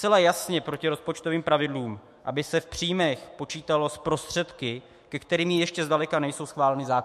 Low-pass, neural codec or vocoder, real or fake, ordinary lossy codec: 14.4 kHz; autoencoder, 48 kHz, 128 numbers a frame, DAC-VAE, trained on Japanese speech; fake; MP3, 64 kbps